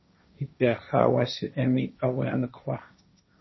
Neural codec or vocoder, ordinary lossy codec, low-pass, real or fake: codec, 16 kHz, 1.1 kbps, Voila-Tokenizer; MP3, 24 kbps; 7.2 kHz; fake